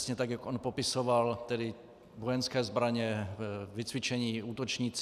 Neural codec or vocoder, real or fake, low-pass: none; real; 14.4 kHz